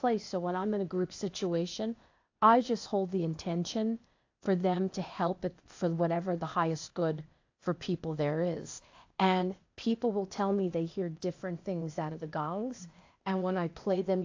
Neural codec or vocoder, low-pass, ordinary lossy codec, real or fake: codec, 16 kHz, 0.8 kbps, ZipCodec; 7.2 kHz; AAC, 48 kbps; fake